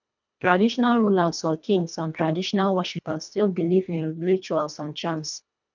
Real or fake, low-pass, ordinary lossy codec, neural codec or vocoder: fake; 7.2 kHz; none; codec, 24 kHz, 1.5 kbps, HILCodec